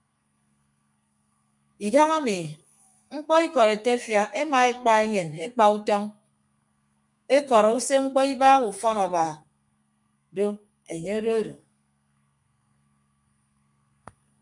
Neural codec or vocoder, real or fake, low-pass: codec, 32 kHz, 1.9 kbps, SNAC; fake; 10.8 kHz